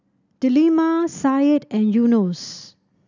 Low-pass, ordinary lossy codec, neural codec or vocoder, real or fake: 7.2 kHz; none; none; real